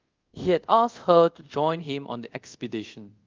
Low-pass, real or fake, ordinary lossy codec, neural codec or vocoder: 7.2 kHz; fake; Opus, 32 kbps; codec, 24 kHz, 0.5 kbps, DualCodec